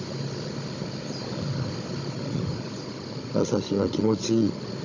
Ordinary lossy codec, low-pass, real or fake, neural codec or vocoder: none; 7.2 kHz; fake; codec, 16 kHz, 16 kbps, FunCodec, trained on Chinese and English, 50 frames a second